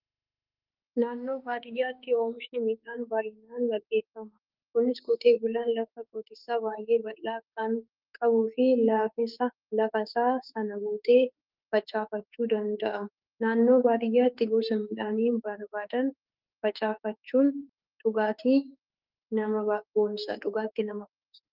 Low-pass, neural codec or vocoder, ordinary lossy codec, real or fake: 5.4 kHz; autoencoder, 48 kHz, 32 numbers a frame, DAC-VAE, trained on Japanese speech; Opus, 32 kbps; fake